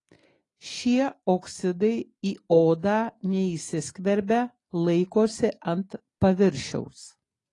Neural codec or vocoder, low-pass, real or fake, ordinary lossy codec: none; 10.8 kHz; real; AAC, 32 kbps